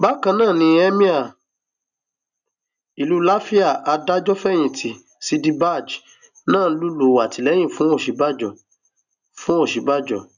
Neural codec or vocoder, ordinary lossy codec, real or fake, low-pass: none; none; real; 7.2 kHz